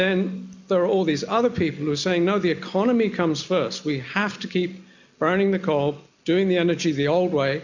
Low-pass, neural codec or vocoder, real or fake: 7.2 kHz; none; real